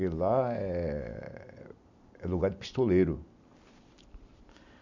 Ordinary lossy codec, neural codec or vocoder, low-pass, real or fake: none; none; 7.2 kHz; real